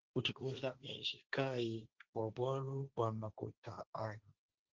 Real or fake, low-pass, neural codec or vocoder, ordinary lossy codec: fake; 7.2 kHz; codec, 16 kHz, 1.1 kbps, Voila-Tokenizer; Opus, 32 kbps